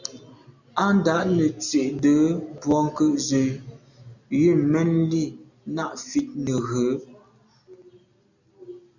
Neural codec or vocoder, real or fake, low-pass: none; real; 7.2 kHz